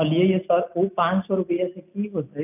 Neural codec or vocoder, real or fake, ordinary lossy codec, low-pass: none; real; none; 3.6 kHz